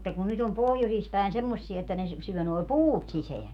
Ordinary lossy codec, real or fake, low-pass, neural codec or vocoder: none; fake; 19.8 kHz; autoencoder, 48 kHz, 128 numbers a frame, DAC-VAE, trained on Japanese speech